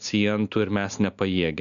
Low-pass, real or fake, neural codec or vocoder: 7.2 kHz; real; none